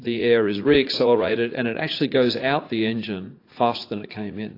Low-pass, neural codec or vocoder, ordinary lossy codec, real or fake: 5.4 kHz; vocoder, 44.1 kHz, 80 mel bands, Vocos; AAC, 32 kbps; fake